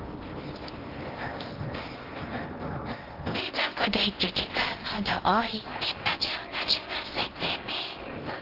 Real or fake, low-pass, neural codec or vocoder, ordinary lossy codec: fake; 5.4 kHz; codec, 16 kHz in and 24 kHz out, 0.8 kbps, FocalCodec, streaming, 65536 codes; Opus, 16 kbps